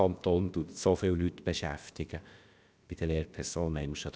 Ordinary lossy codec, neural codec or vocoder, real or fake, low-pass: none; codec, 16 kHz, about 1 kbps, DyCAST, with the encoder's durations; fake; none